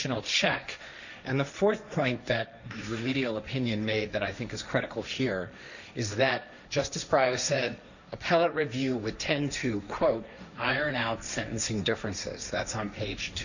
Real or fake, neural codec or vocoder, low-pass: fake; codec, 16 kHz, 1.1 kbps, Voila-Tokenizer; 7.2 kHz